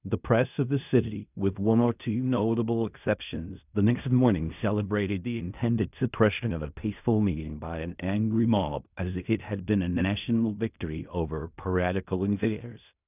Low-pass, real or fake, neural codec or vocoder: 3.6 kHz; fake; codec, 16 kHz in and 24 kHz out, 0.4 kbps, LongCat-Audio-Codec, fine tuned four codebook decoder